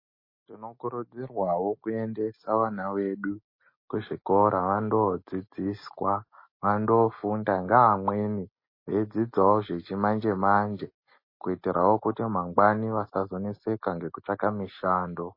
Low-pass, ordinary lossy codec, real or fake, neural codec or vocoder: 5.4 kHz; MP3, 24 kbps; real; none